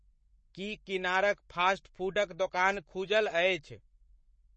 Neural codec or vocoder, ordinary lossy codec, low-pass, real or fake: codec, 44.1 kHz, 7.8 kbps, Pupu-Codec; MP3, 32 kbps; 10.8 kHz; fake